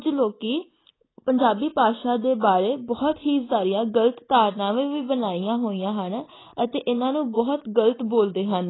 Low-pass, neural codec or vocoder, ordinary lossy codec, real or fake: 7.2 kHz; none; AAC, 16 kbps; real